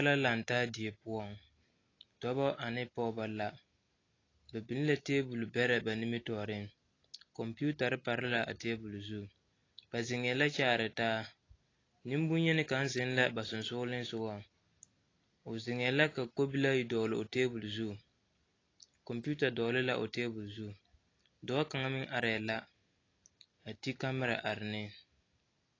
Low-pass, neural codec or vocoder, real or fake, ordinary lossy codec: 7.2 kHz; none; real; AAC, 32 kbps